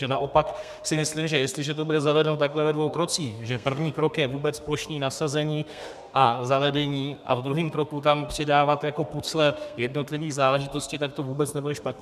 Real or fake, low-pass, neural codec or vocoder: fake; 14.4 kHz; codec, 32 kHz, 1.9 kbps, SNAC